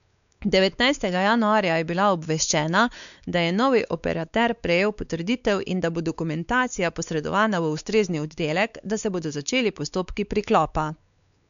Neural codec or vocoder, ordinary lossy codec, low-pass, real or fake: codec, 16 kHz, 4 kbps, X-Codec, WavLM features, trained on Multilingual LibriSpeech; MP3, 96 kbps; 7.2 kHz; fake